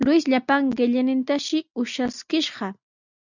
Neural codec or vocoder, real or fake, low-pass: none; real; 7.2 kHz